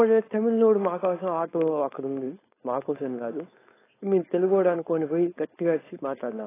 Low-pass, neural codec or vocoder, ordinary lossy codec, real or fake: 3.6 kHz; codec, 16 kHz, 4.8 kbps, FACodec; AAC, 16 kbps; fake